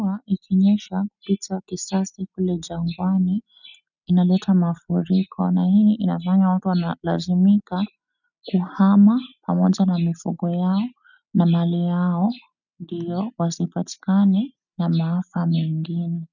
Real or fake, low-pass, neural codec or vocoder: real; 7.2 kHz; none